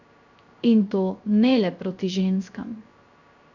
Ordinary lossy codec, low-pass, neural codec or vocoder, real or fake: none; 7.2 kHz; codec, 16 kHz, 0.3 kbps, FocalCodec; fake